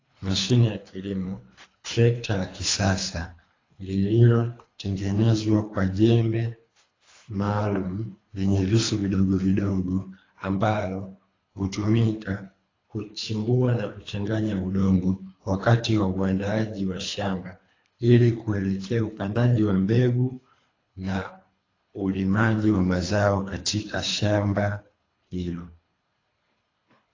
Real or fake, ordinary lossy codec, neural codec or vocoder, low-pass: fake; AAC, 32 kbps; codec, 24 kHz, 3 kbps, HILCodec; 7.2 kHz